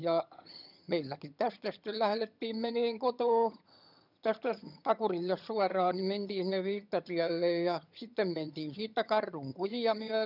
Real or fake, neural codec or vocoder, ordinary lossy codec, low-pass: fake; vocoder, 22.05 kHz, 80 mel bands, HiFi-GAN; none; 5.4 kHz